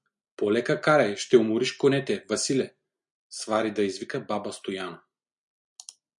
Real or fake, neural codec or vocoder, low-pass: real; none; 10.8 kHz